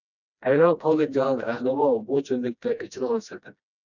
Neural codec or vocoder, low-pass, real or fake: codec, 16 kHz, 1 kbps, FreqCodec, smaller model; 7.2 kHz; fake